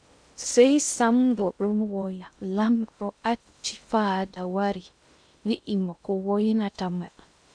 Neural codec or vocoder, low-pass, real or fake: codec, 16 kHz in and 24 kHz out, 0.6 kbps, FocalCodec, streaming, 2048 codes; 9.9 kHz; fake